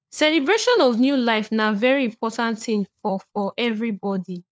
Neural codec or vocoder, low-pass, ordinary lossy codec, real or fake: codec, 16 kHz, 4 kbps, FunCodec, trained on LibriTTS, 50 frames a second; none; none; fake